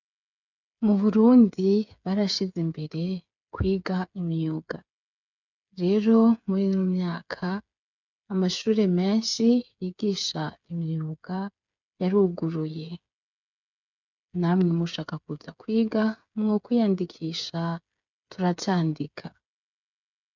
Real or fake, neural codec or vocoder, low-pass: fake; codec, 16 kHz, 8 kbps, FreqCodec, smaller model; 7.2 kHz